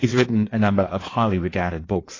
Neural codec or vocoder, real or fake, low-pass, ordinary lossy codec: codec, 16 kHz in and 24 kHz out, 1.1 kbps, FireRedTTS-2 codec; fake; 7.2 kHz; AAC, 32 kbps